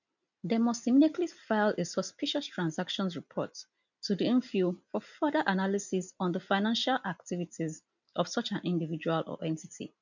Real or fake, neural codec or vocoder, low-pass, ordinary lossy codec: real; none; 7.2 kHz; none